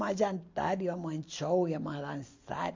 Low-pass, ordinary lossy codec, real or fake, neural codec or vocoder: 7.2 kHz; MP3, 48 kbps; real; none